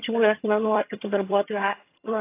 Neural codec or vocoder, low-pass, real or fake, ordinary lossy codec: vocoder, 22.05 kHz, 80 mel bands, HiFi-GAN; 3.6 kHz; fake; AAC, 24 kbps